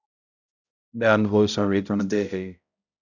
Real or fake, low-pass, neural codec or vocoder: fake; 7.2 kHz; codec, 16 kHz, 0.5 kbps, X-Codec, HuBERT features, trained on balanced general audio